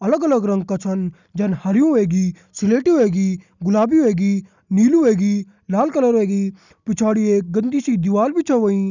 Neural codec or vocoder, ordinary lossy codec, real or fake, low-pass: none; none; real; 7.2 kHz